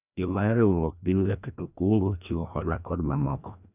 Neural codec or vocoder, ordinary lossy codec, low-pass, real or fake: codec, 16 kHz, 1 kbps, FreqCodec, larger model; none; 3.6 kHz; fake